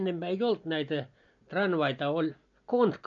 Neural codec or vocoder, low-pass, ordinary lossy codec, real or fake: none; 7.2 kHz; MP3, 48 kbps; real